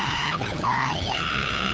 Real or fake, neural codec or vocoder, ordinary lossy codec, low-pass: fake; codec, 16 kHz, 8 kbps, FunCodec, trained on LibriTTS, 25 frames a second; none; none